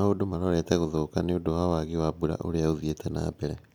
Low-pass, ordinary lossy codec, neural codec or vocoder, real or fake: 19.8 kHz; none; none; real